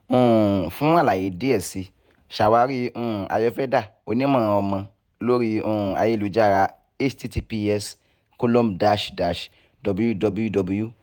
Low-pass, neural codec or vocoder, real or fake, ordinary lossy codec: none; vocoder, 48 kHz, 128 mel bands, Vocos; fake; none